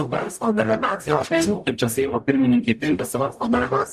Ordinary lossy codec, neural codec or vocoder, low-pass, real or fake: Opus, 64 kbps; codec, 44.1 kHz, 0.9 kbps, DAC; 14.4 kHz; fake